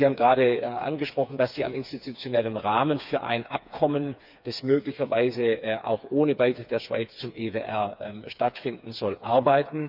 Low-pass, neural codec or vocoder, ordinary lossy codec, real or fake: 5.4 kHz; codec, 16 kHz, 4 kbps, FreqCodec, smaller model; none; fake